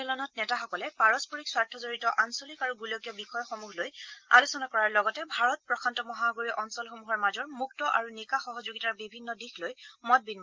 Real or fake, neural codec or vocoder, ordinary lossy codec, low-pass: real; none; Opus, 32 kbps; 7.2 kHz